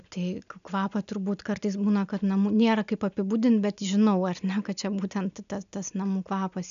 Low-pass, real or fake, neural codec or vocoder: 7.2 kHz; real; none